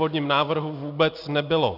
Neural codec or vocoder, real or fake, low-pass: none; real; 5.4 kHz